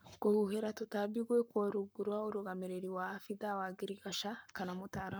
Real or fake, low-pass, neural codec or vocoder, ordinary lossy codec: fake; none; codec, 44.1 kHz, 7.8 kbps, Pupu-Codec; none